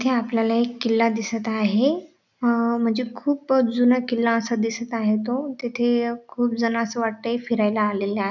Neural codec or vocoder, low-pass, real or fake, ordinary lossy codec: none; 7.2 kHz; real; none